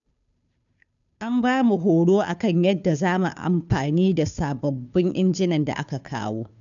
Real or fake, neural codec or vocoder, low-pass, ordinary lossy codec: fake; codec, 16 kHz, 2 kbps, FunCodec, trained on Chinese and English, 25 frames a second; 7.2 kHz; none